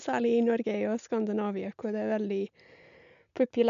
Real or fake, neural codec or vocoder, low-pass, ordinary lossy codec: real; none; 7.2 kHz; none